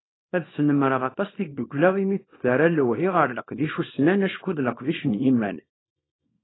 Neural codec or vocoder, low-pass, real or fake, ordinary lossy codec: codec, 24 kHz, 0.9 kbps, WavTokenizer, small release; 7.2 kHz; fake; AAC, 16 kbps